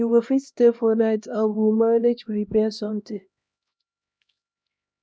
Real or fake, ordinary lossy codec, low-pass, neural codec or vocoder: fake; none; none; codec, 16 kHz, 1 kbps, X-Codec, HuBERT features, trained on LibriSpeech